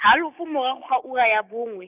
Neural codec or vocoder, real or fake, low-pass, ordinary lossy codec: codec, 16 kHz, 6 kbps, DAC; fake; 3.6 kHz; none